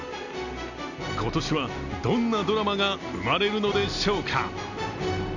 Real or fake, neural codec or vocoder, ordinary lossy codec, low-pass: real; none; none; 7.2 kHz